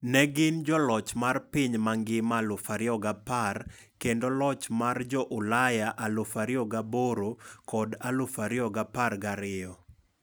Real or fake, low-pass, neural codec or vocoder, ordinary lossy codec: real; none; none; none